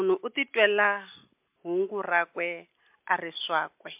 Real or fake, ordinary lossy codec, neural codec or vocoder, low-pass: real; MP3, 32 kbps; none; 3.6 kHz